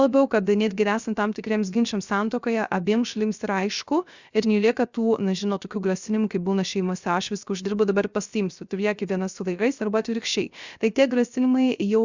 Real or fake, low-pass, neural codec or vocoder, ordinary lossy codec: fake; 7.2 kHz; codec, 16 kHz, 0.3 kbps, FocalCodec; Opus, 64 kbps